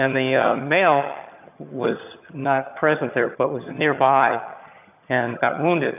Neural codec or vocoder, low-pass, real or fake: vocoder, 22.05 kHz, 80 mel bands, HiFi-GAN; 3.6 kHz; fake